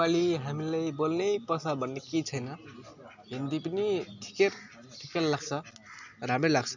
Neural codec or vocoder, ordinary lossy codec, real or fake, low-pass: none; none; real; 7.2 kHz